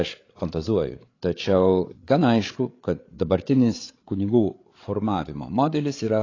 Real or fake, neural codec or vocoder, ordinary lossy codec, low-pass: fake; codec, 16 kHz, 8 kbps, FreqCodec, larger model; AAC, 32 kbps; 7.2 kHz